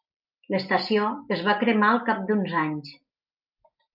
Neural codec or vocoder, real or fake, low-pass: none; real; 5.4 kHz